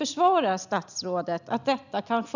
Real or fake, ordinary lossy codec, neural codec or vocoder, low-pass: real; none; none; 7.2 kHz